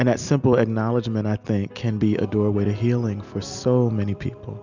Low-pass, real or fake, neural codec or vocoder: 7.2 kHz; real; none